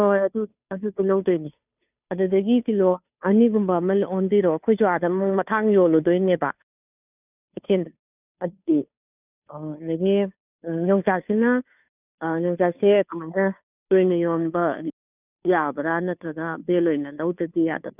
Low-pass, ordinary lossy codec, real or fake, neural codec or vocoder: 3.6 kHz; none; fake; codec, 16 kHz, 2 kbps, FunCodec, trained on Chinese and English, 25 frames a second